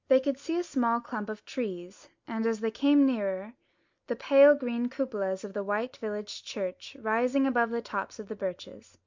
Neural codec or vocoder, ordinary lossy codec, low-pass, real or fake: none; MP3, 64 kbps; 7.2 kHz; real